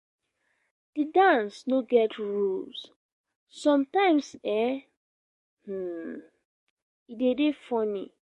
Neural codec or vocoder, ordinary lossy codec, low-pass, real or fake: codec, 44.1 kHz, 7.8 kbps, DAC; MP3, 48 kbps; 14.4 kHz; fake